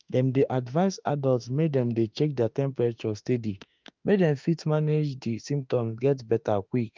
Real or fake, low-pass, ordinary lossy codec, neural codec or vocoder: fake; 7.2 kHz; Opus, 24 kbps; autoencoder, 48 kHz, 32 numbers a frame, DAC-VAE, trained on Japanese speech